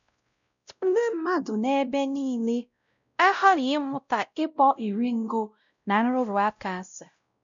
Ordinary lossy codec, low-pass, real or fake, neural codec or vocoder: none; 7.2 kHz; fake; codec, 16 kHz, 0.5 kbps, X-Codec, WavLM features, trained on Multilingual LibriSpeech